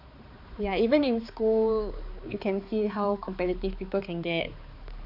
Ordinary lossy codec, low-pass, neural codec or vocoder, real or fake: none; 5.4 kHz; codec, 16 kHz, 4 kbps, X-Codec, HuBERT features, trained on balanced general audio; fake